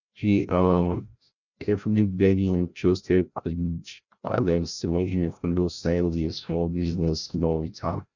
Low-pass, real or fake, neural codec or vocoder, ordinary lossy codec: 7.2 kHz; fake; codec, 16 kHz, 0.5 kbps, FreqCodec, larger model; none